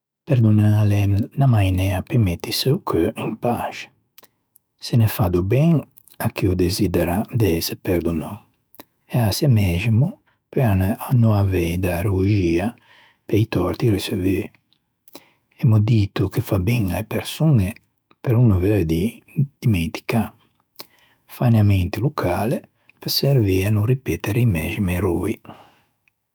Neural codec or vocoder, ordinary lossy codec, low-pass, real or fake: autoencoder, 48 kHz, 128 numbers a frame, DAC-VAE, trained on Japanese speech; none; none; fake